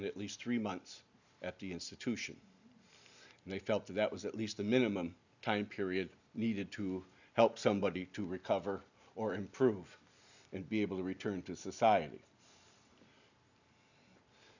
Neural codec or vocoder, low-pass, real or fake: none; 7.2 kHz; real